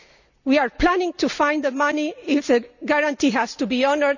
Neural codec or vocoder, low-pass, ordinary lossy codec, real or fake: none; 7.2 kHz; none; real